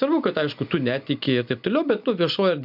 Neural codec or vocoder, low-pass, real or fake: none; 5.4 kHz; real